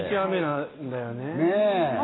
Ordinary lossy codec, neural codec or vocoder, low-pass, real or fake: AAC, 16 kbps; none; 7.2 kHz; real